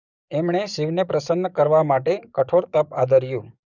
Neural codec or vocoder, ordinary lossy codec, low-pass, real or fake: codec, 44.1 kHz, 7.8 kbps, DAC; none; 7.2 kHz; fake